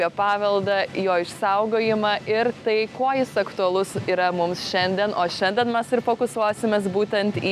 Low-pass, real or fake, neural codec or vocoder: 14.4 kHz; real; none